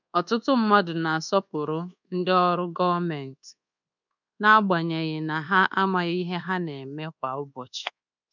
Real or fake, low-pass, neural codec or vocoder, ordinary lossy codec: fake; 7.2 kHz; codec, 24 kHz, 1.2 kbps, DualCodec; none